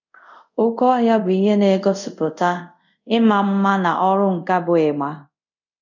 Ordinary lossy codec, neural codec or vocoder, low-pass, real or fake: none; codec, 24 kHz, 0.5 kbps, DualCodec; 7.2 kHz; fake